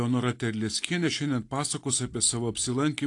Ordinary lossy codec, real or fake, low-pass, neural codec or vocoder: AAC, 48 kbps; real; 10.8 kHz; none